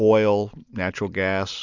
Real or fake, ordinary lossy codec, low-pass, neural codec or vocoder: real; Opus, 64 kbps; 7.2 kHz; none